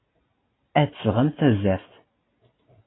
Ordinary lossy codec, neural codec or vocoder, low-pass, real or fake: AAC, 16 kbps; none; 7.2 kHz; real